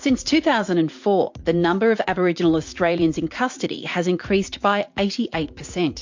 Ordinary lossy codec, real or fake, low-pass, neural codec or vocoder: MP3, 48 kbps; fake; 7.2 kHz; vocoder, 44.1 kHz, 80 mel bands, Vocos